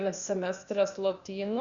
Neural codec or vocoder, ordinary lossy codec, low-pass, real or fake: codec, 16 kHz, 0.8 kbps, ZipCodec; Opus, 64 kbps; 7.2 kHz; fake